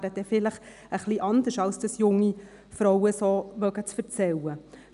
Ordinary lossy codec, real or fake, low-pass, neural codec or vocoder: none; real; 10.8 kHz; none